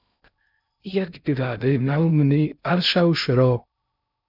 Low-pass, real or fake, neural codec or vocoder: 5.4 kHz; fake; codec, 16 kHz in and 24 kHz out, 0.6 kbps, FocalCodec, streaming, 2048 codes